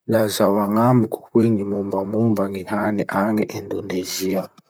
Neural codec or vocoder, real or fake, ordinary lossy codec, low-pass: vocoder, 44.1 kHz, 128 mel bands, Pupu-Vocoder; fake; none; none